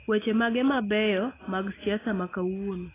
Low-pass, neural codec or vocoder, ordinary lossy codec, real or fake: 3.6 kHz; none; AAC, 16 kbps; real